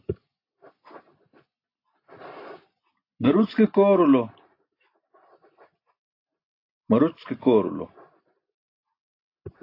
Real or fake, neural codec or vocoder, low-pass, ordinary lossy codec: real; none; 5.4 kHz; AAC, 32 kbps